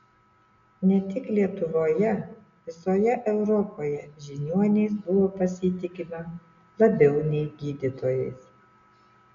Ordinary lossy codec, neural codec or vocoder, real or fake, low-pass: MP3, 96 kbps; none; real; 7.2 kHz